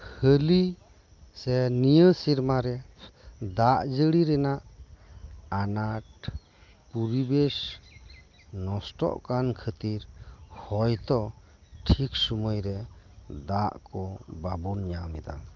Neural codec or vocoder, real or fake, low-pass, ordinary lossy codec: none; real; 7.2 kHz; Opus, 24 kbps